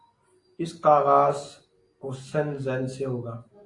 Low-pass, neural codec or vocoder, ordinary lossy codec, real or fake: 10.8 kHz; none; AAC, 48 kbps; real